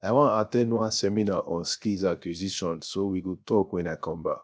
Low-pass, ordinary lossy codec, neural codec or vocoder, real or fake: none; none; codec, 16 kHz, 0.7 kbps, FocalCodec; fake